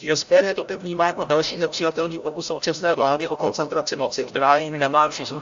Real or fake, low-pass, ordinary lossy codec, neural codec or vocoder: fake; 7.2 kHz; AAC, 64 kbps; codec, 16 kHz, 0.5 kbps, FreqCodec, larger model